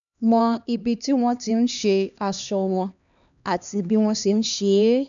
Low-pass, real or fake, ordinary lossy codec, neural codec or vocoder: 7.2 kHz; fake; none; codec, 16 kHz, 2 kbps, X-Codec, HuBERT features, trained on LibriSpeech